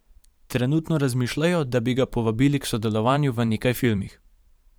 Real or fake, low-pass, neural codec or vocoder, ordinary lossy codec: fake; none; vocoder, 44.1 kHz, 128 mel bands every 512 samples, BigVGAN v2; none